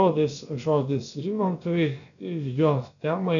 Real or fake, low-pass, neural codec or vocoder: fake; 7.2 kHz; codec, 16 kHz, about 1 kbps, DyCAST, with the encoder's durations